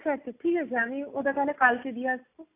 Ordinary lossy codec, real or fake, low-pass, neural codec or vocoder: AAC, 24 kbps; real; 3.6 kHz; none